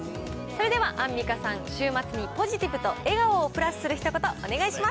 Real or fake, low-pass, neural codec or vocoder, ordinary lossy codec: real; none; none; none